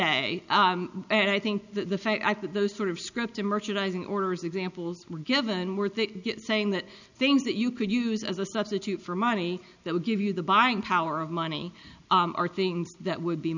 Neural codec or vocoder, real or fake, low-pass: none; real; 7.2 kHz